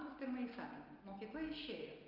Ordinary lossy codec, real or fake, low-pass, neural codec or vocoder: Opus, 32 kbps; real; 5.4 kHz; none